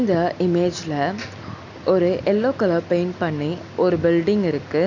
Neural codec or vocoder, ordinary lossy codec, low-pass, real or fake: none; none; 7.2 kHz; real